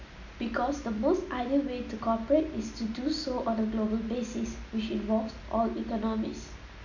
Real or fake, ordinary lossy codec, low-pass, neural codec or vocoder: real; none; 7.2 kHz; none